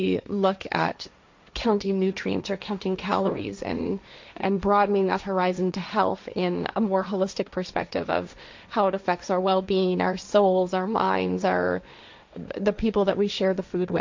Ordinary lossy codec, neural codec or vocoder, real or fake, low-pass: MP3, 64 kbps; codec, 16 kHz, 1.1 kbps, Voila-Tokenizer; fake; 7.2 kHz